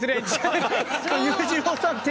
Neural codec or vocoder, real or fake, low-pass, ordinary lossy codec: none; real; none; none